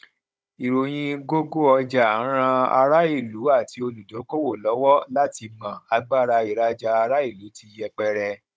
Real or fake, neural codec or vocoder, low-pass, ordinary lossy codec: fake; codec, 16 kHz, 16 kbps, FunCodec, trained on Chinese and English, 50 frames a second; none; none